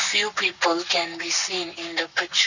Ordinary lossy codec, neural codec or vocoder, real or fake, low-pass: none; codec, 44.1 kHz, 7.8 kbps, Pupu-Codec; fake; 7.2 kHz